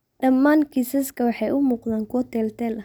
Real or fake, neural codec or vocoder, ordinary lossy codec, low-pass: real; none; none; none